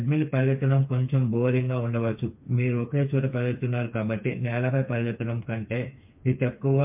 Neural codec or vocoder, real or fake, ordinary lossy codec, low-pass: codec, 16 kHz, 4 kbps, FreqCodec, smaller model; fake; MP3, 32 kbps; 3.6 kHz